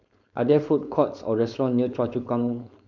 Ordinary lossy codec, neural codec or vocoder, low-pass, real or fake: none; codec, 16 kHz, 4.8 kbps, FACodec; 7.2 kHz; fake